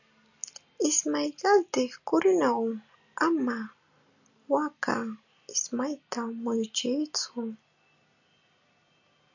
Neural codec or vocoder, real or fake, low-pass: none; real; 7.2 kHz